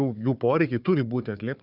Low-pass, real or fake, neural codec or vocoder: 5.4 kHz; fake; codec, 16 kHz, 4 kbps, FunCodec, trained on Chinese and English, 50 frames a second